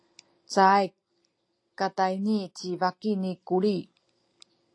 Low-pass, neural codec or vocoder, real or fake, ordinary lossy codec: 9.9 kHz; none; real; AAC, 48 kbps